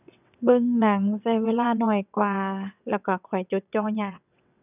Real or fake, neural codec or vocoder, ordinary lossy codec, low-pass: fake; vocoder, 22.05 kHz, 80 mel bands, WaveNeXt; none; 3.6 kHz